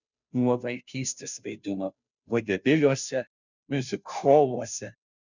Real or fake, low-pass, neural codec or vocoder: fake; 7.2 kHz; codec, 16 kHz, 0.5 kbps, FunCodec, trained on Chinese and English, 25 frames a second